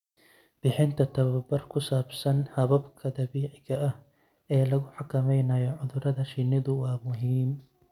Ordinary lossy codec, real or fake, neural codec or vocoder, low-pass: none; real; none; 19.8 kHz